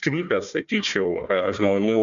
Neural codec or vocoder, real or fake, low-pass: codec, 16 kHz, 1 kbps, FunCodec, trained on Chinese and English, 50 frames a second; fake; 7.2 kHz